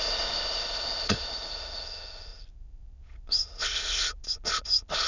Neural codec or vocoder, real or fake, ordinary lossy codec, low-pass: autoencoder, 22.05 kHz, a latent of 192 numbers a frame, VITS, trained on many speakers; fake; none; 7.2 kHz